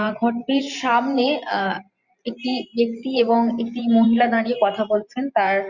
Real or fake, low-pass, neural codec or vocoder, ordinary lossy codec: fake; 7.2 kHz; vocoder, 44.1 kHz, 128 mel bands every 512 samples, BigVGAN v2; Opus, 64 kbps